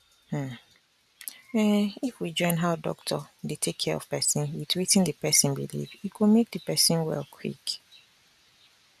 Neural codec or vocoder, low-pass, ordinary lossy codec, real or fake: none; 14.4 kHz; none; real